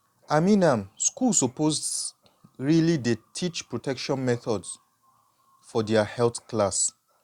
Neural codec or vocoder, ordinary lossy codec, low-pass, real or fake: none; Opus, 64 kbps; 19.8 kHz; real